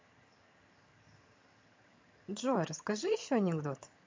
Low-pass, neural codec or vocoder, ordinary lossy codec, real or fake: 7.2 kHz; vocoder, 22.05 kHz, 80 mel bands, HiFi-GAN; MP3, 48 kbps; fake